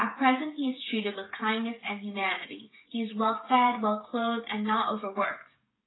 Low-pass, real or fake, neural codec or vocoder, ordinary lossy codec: 7.2 kHz; fake; codec, 16 kHz, 8 kbps, FreqCodec, smaller model; AAC, 16 kbps